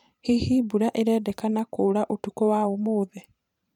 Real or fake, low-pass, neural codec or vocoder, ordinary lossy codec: fake; 19.8 kHz; vocoder, 44.1 kHz, 128 mel bands every 512 samples, BigVGAN v2; none